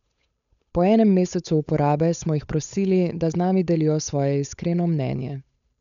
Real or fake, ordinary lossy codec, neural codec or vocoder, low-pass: fake; none; codec, 16 kHz, 8 kbps, FunCodec, trained on Chinese and English, 25 frames a second; 7.2 kHz